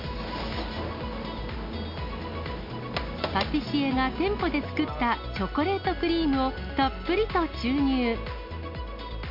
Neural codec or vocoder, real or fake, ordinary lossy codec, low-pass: none; real; MP3, 48 kbps; 5.4 kHz